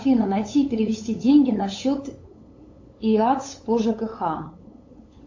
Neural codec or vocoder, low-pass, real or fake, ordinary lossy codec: codec, 16 kHz, 8 kbps, FunCodec, trained on LibriTTS, 25 frames a second; 7.2 kHz; fake; AAC, 48 kbps